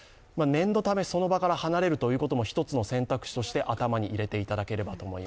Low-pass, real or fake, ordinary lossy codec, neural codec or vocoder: none; real; none; none